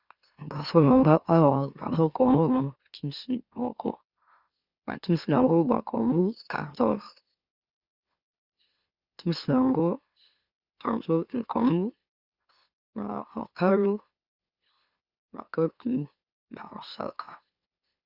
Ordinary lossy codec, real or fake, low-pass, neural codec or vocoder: Opus, 64 kbps; fake; 5.4 kHz; autoencoder, 44.1 kHz, a latent of 192 numbers a frame, MeloTTS